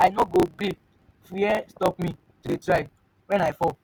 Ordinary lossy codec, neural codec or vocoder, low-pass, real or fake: none; none; none; real